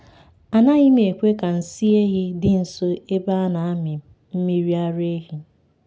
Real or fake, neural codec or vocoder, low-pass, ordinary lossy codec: real; none; none; none